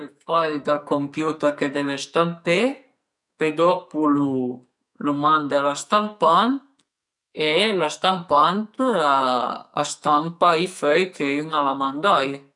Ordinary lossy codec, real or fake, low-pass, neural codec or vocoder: none; fake; 10.8 kHz; codec, 44.1 kHz, 2.6 kbps, SNAC